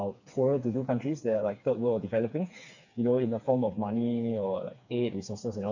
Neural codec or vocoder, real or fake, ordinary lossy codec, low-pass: codec, 16 kHz, 4 kbps, FreqCodec, smaller model; fake; none; 7.2 kHz